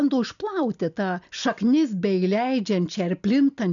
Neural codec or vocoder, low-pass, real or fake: none; 7.2 kHz; real